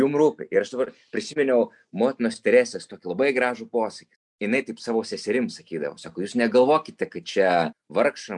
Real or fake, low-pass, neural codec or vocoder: real; 10.8 kHz; none